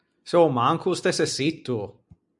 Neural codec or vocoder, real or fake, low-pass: none; real; 10.8 kHz